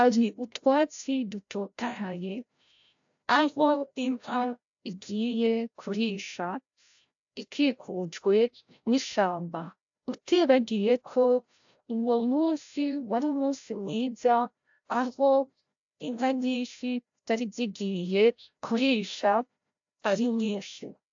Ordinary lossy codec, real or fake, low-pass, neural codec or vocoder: AAC, 64 kbps; fake; 7.2 kHz; codec, 16 kHz, 0.5 kbps, FreqCodec, larger model